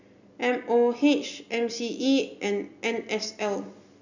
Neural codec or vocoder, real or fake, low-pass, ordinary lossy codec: none; real; 7.2 kHz; none